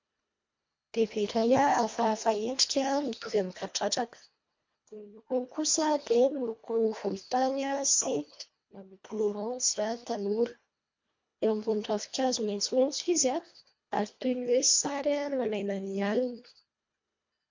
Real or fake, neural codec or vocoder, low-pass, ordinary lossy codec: fake; codec, 24 kHz, 1.5 kbps, HILCodec; 7.2 kHz; MP3, 48 kbps